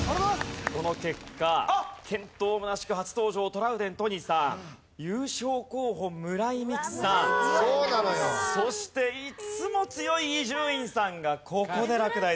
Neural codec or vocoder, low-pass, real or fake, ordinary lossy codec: none; none; real; none